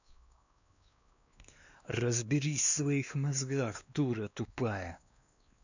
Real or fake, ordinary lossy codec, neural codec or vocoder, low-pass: fake; none; codec, 16 kHz, 2 kbps, X-Codec, WavLM features, trained on Multilingual LibriSpeech; 7.2 kHz